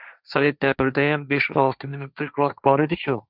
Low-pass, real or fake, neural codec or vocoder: 5.4 kHz; fake; codec, 16 kHz, 1.1 kbps, Voila-Tokenizer